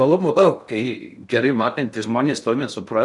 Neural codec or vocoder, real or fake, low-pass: codec, 16 kHz in and 24 kHz out, 0.6 kbps, FocalCodec, streaming, 2048 codes; fake; 10.8 kHz